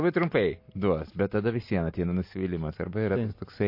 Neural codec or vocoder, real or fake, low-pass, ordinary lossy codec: none; real; 5.4 kHz; MP3, 32 kbps